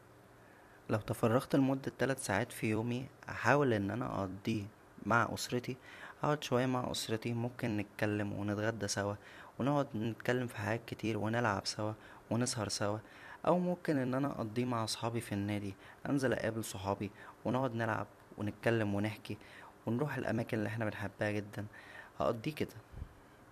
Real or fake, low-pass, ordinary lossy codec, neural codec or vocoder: fake; 14.4 kHz; none; vocoder, 44.1 kHz, 128 mel bands every 256 samples, BigVGAN v2